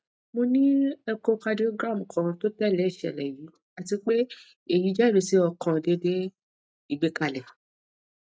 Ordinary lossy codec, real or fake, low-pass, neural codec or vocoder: none; real; none; none